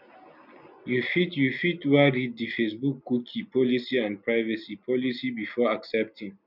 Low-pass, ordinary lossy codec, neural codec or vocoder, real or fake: 5.4 kHz; none; none; real